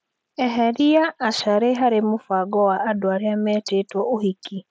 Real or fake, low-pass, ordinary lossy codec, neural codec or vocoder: real; none; none; none